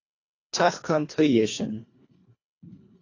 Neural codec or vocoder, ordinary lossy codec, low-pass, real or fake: codec, 24 kHz, 1.5 kbps, HILCodec; AAC, 32 kbps; 7.2 kHz; fake